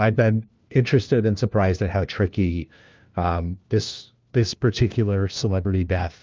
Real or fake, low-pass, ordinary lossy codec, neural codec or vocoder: fake; 7.2 kHz; Opus, 24 kbps; codec, 16 kHz, 1 kbps, FunCodec, trained on LibriTTS, 50 frames a second